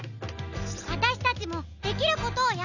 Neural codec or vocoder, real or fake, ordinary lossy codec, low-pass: none; real; none; 7.2 kHz